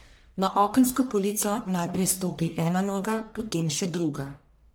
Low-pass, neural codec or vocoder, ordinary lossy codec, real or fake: none; codec, 44.1 kHz, 1.7 kbps, Pupu-Codec; none; fake